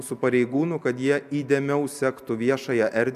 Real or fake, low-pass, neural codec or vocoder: real; 14.4 kHz; none